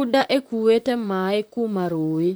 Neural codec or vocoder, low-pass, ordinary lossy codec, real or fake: vocoder, 44.1 kHz, 128 mel bands, Pupu-Vocoder; none; none; fake